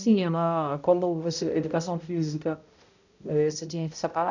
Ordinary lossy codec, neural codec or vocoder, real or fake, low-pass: none; codec, 16 kHz, 0.5 kbps, X-Codec, HuBERT features, trained on balanced general audio; fake; 7.2 kHz